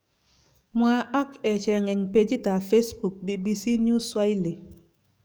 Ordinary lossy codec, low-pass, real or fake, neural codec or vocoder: none; none; fake; codec, 44.1 kHz, 7.8 kbps, DAC